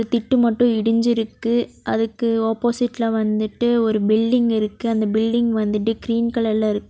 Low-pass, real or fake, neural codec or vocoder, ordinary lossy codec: none; real; none; none